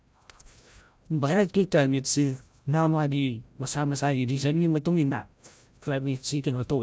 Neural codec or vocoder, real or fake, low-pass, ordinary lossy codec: codec, 16 kHz, 0.5 kbps, FreqCodec, larger model; fake; none; none